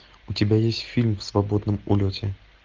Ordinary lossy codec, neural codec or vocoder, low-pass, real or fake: Opus, 32 kbps; none; 7.2 kHz; real